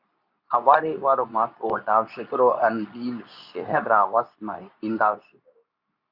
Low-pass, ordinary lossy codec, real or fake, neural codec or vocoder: 5.4 kHz; Opus, 64 kbps; fake; codec, 24 kHz, 0.9 kbps, WavTokenizer, medium speech release version 2